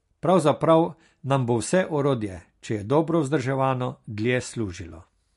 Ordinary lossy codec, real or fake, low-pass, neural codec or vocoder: MP3, 48 kbps; real; 14.4 kHz; none